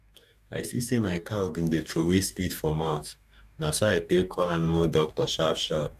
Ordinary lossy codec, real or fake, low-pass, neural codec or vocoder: none; fake; 14.4 kHz; codec, 44.1 kHz, 2.6 kbps, DAC